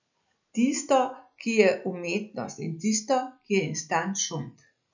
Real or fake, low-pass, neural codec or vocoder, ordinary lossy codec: real; 7.2 kHz; none; none